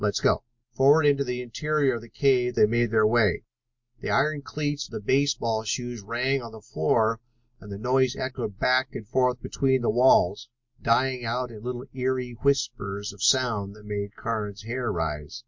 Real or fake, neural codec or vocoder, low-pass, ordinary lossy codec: real; none; 7.2 kHz; MP3, 48 kbps